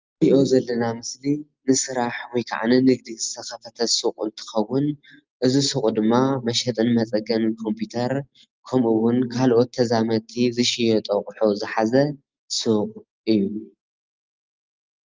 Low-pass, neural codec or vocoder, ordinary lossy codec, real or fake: 7.2 kHz; none; Opus, 32 kbps; real